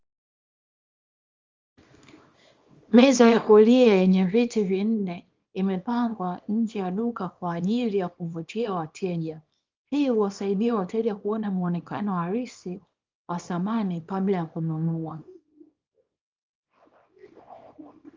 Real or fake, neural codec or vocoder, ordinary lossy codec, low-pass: fake; codec, 24 kHz, 0.9 kbps, WavTokenizer, small release; Opus, 32 kbps; 7.2 kHz